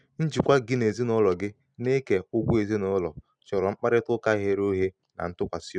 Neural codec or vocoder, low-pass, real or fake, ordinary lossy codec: none; 9.9 kHz; real; none